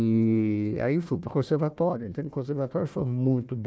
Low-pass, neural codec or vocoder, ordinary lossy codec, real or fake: none; codec, 16 kHz, 1 kbps, FunCodec, trained on Chinese and English, 50 frames a second; none; fake